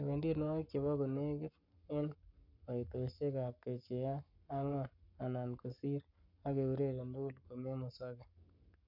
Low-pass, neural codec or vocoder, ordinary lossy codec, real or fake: 5.4 kHz; codec, 44.1 kHz, 7.8 kbps, Pupu-Codec; none; fake